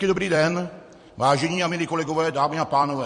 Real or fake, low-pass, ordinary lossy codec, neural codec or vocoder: fake; 14.4 kHz; MP3, 48 kbps; vocoder, 44.1 kHz, 128 mel bands every 256 samples, BigVGAN v2